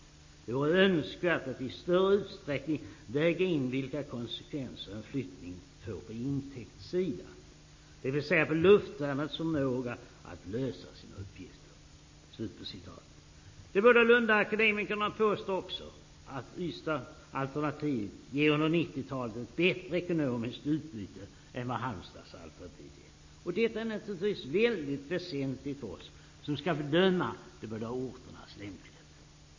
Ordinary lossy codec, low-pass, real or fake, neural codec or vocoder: MP3, 32 kbps; 7.2 kHz; real; none